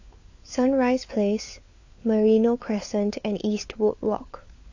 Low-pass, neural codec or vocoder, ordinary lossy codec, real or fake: 7.2 kHz; codec, 16 kHz, 4 kbps, FunCodec, trained on LibriTTS, 50 frames a second; AAC, 48 kbps; fake